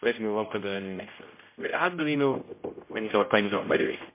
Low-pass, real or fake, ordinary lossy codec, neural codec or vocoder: 3.6 kHz; fake; MP3, 24 kbps; codec, 16 kHz, 0.5 kbps, X-Codec, HuBERT features, trained on general audio